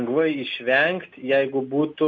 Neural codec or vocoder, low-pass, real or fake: none; 7.2 kHz; real